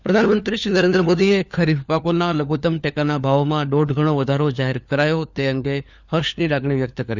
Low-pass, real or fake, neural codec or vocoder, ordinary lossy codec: 7.2 kHz; fake; codec, 16 kHz, 4 kbps, FunCodec, trained on LibriTTS, 50 frames a second; none